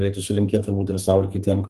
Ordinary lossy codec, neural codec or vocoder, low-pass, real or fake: Opus, 32 kbps; codec, 32 kHz, 1.9 kbps, SNAC; 14.4 kHz; fake